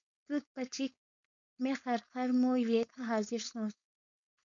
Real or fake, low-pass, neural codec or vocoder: fake; 7.2 kHz; codec, 16 kHz, 4.8 kbps, FACodec